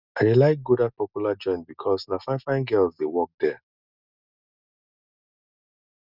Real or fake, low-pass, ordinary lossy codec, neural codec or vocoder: real; 5.4 kHz; none; none